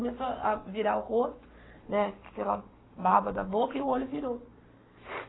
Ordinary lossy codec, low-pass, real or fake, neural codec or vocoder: AAC, 16 kbps; 7.2 kHz; fake; codec, 44.1 kHz, 7.8 kbps, Pupu-Codec